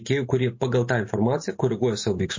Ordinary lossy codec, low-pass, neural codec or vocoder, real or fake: MP3, 32 kbps; 7.2 kHz; none; real